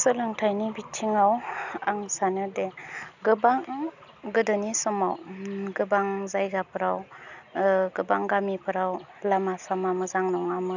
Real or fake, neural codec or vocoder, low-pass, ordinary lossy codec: real; none; 7.2 kHz; none